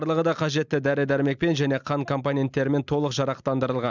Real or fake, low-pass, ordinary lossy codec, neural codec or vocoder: real; 7.2 kHz; none; none